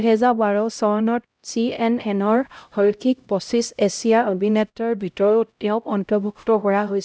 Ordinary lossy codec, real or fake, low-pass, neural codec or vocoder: none; fake; none; codec, 16 kHz, 0.5 kbps, X-Codec, HuBERT features, trained on LibriSpeech